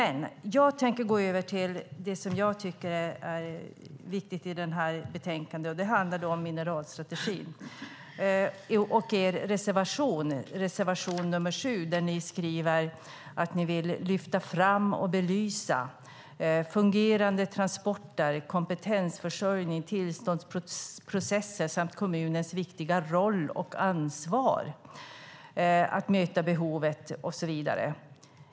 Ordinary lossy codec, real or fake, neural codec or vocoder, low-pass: none; real; none; none